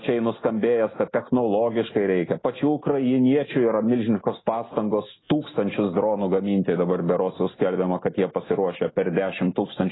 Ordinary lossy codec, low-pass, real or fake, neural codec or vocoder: AAC, 16 kbps; 7.2 kHz; real; none